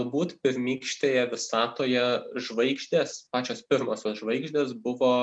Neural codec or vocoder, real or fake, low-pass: none; real; 9.9 kHz